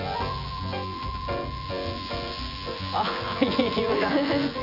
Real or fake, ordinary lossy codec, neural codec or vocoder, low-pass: fake; none; vocoder, 24 kHz, 100 mel bands, Vocos; 5.4 kHz